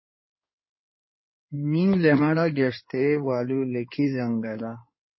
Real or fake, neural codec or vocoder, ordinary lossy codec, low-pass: fake; codec, 16 kHz in and 24 kHz out, 2.2 kbps, FireRedTTS-2 codec; MP3, 24 kbps; 7.2 kHz